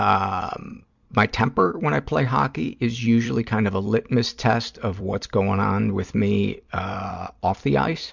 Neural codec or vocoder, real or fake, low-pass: vocoder, 44.1 kHz, 128 mel bands every 256 samples, BigVGAN v2; fake; 7.2 kHz